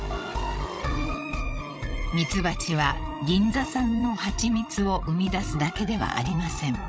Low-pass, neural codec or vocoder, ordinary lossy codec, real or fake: none; codec, 16 kHz, 16 kbps, FreqCodec, larger model; none; fake